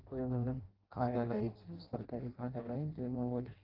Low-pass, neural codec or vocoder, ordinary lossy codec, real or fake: 5.4 kHz; codec, 16 kHz in and 24 kHz out, 0.6 kbps, FireRedTTS-2 codec; Opus, 16 kbps; fake